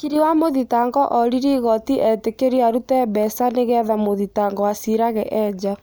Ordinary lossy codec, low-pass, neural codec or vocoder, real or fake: none; none; none; real